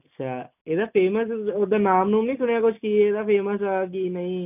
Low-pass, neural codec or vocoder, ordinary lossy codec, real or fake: 3.6 kHz; none; none; real